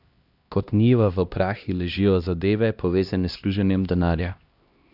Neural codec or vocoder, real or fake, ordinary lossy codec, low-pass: codec, 16 kHz, 1 kbps, X-Codec, HuBERT features, trained on LibriSpeech; fake; Opus, 64 kbps; 5.4 kHz